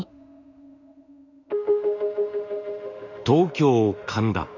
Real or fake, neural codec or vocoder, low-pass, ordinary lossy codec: fake; autoencoder, 48 kHz, 32 numbers a frame, DAC-VAE, trained on Japanese speech; 7.2 kHz; none